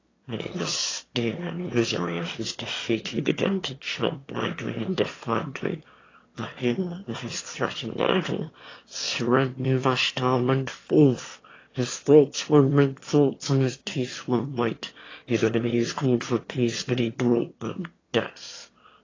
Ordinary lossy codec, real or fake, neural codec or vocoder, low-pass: AAC, 32 kbps; fake; autoencoder, 22.05 kHz, a latent of 192 numbers a frame, VITS, trained on one speaker; 7.2 kHz